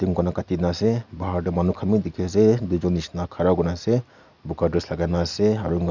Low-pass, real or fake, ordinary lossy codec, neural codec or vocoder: 7.2 kHz; real; none; none